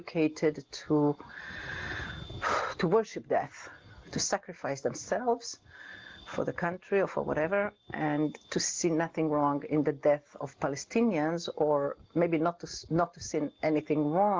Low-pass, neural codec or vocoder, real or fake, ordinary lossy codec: 7.2 kHz; none; real; Opus, 16 kbps